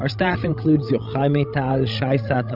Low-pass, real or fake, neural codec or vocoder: 5.4 kHz; fake; codec, 16 kHz, 16 kbps, FreqCodec, larger model